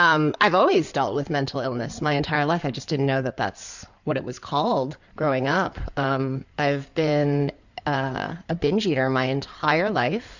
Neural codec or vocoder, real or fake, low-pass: codec, 16 kHz in and 24 kHz out, 2.2 kbps, FireRedTTS-2 codec; fake; 7.2 kHz